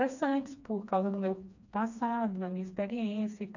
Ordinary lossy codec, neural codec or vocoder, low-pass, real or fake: none; codec, 16 kHz, 2 kbps, FreqCodec, smaller model; 7.2 kHz; fake